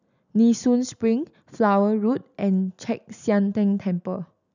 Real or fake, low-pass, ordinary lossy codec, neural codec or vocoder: real; 7.2 kHz; none; none